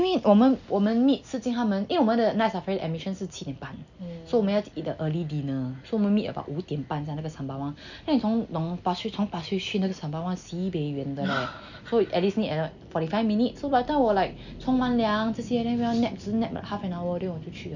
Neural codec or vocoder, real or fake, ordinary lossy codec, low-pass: none; real; none; 7.2 kHz